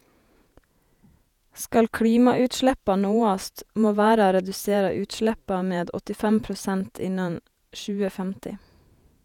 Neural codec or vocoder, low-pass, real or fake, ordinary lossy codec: vocoder, 48 kHz, 128 mel bands, Vocos; 19.8 kHz; fake; none